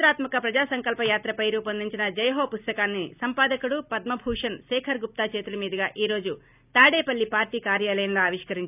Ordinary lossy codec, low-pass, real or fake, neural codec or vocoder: none; 3.6 kHz; real; none